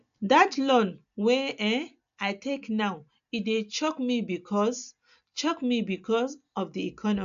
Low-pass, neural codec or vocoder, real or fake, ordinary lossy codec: 7.2 kHz; none; real; AAC, 96 kbps